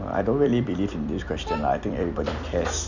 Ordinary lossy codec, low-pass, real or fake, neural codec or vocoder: none; 7.2 kHz; fake; vocoder, 44.1 kHz, 128 mel bands every 256 samples, BigVGAN v2